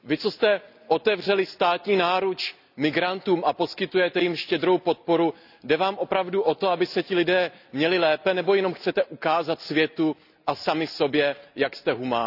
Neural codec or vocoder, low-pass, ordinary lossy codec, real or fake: none; 5.4 kHz; none; real